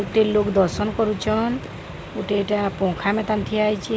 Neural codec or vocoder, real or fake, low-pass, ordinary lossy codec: none; real; none; none